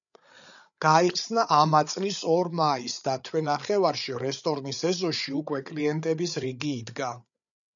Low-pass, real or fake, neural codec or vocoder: 7.2 kHz; fake; codec, 16 kHz, 8 kbps, FreqCodec, larger model